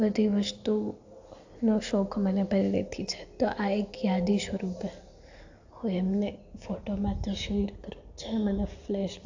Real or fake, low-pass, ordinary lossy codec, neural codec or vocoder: real; 7.2 kHz; AAC, 48 kbps; none